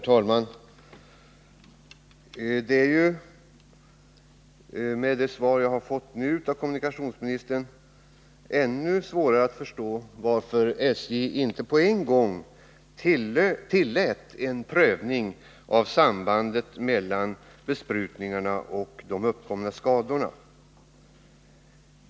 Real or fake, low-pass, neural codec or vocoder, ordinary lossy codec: real; none; none; none